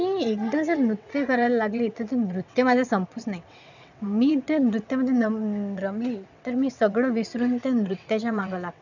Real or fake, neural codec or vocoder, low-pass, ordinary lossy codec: fake; vocoder, 22.05 kHz, 80 mel bands, WaveNeXt; 7.2 kHz; none